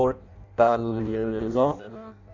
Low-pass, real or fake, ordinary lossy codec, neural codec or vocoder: 7.2 kHz; fake; none; codec, 16 kHz in and 24 kHz out, 0.6 kbps, FireRedTTS-2 codec